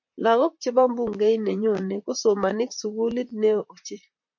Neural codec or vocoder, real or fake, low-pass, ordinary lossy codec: vocoder, 44.1 kHz, 128 mel bands, Pupu-Vocoder; fake; 7.2 kHz; MP3, 48 kbps